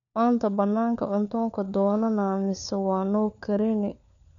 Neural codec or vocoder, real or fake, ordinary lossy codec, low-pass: codec, 16 kHz, 4 kbps, FunCodec, trained on LibriTTS, 50 frames a second; fake; none; 7.2 kHz